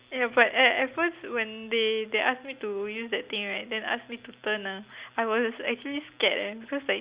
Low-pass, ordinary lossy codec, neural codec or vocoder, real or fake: 3.6 kHz; Opus, 64 kbps; none; real